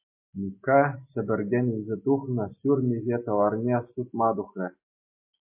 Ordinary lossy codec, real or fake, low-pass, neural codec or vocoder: MP3, 24 kbps; real; 3.6 kHz; none